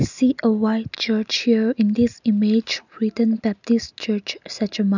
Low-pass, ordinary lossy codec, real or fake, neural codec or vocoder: 7.2 kHz; none; real; none